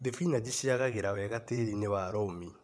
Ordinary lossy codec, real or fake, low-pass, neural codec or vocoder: none; fake; none; vocoder, 22.05 kHz, 80 mel bands, WaveNeXt